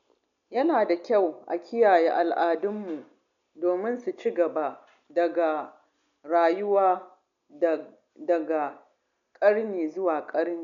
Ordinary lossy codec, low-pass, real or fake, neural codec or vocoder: none; 7.2 kHz; real; none